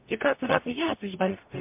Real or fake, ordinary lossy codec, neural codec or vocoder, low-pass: fake; MP3, 32 kbps; codec, 44.1 kHz, 0.9 kbps, DAC; 3.6 kHz